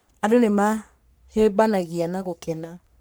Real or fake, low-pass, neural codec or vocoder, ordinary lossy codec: fake; none; codec, 44.1 kHz, 3.4 kbps, Pupu-Codec; none